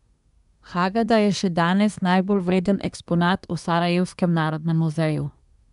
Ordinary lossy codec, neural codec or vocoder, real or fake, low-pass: none; codec, 24 kHz, 1 kbps, SNAC; fake; 10.8 kHz